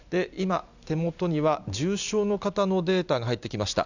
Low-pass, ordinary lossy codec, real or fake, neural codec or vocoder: 7.2 kHz; none; real; none